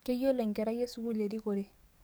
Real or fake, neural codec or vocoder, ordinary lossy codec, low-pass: fake; codec, 44.1 kHz, 7.8 kbps, DAC; none; none